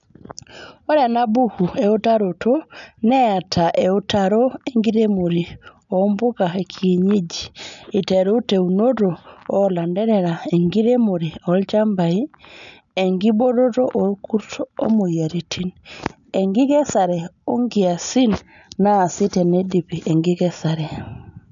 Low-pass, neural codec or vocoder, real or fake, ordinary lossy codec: 7.2 kHz; none; real; none